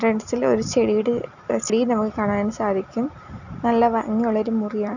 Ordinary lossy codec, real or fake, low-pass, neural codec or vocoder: none; real; 7.2 kHz; none